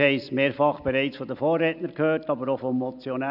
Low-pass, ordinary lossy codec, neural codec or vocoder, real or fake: 5.4 kHz; none; none; real